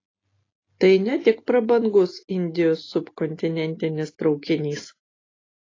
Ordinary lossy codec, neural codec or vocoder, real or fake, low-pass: AAC, 32 kbps; none; real; 7.2 kHz